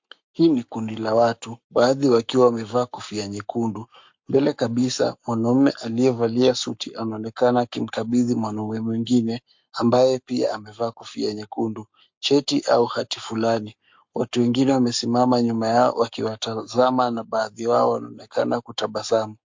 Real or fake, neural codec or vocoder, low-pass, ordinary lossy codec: fake; codec, 44.1 kHz, 7.8 kbps, Pupu-Codec; 7.2 kHz; MP3, 48 kbps